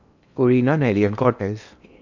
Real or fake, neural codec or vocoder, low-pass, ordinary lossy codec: fake; codec, 16 kHz in and 24 kHz out, 0.8 kbps, FocalCodec, streaming, 65536 codes; 7.2 kHz; none